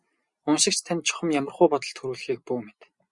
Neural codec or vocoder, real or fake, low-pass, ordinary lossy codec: none; real; 10.8 kHz; Opus, 64 kbps